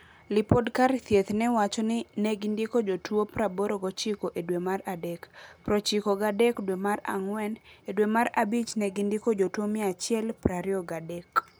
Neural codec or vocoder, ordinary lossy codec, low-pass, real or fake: none; none; none; real